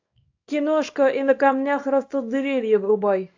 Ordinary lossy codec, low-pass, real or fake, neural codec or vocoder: AAC, 48 kbps; 7.2 kHz; fake; codec, 24 kHz, 0.9 kbps, WavTokenizer, small release